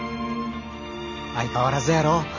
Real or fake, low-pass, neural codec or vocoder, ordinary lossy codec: real; 7.2 kHz; none; none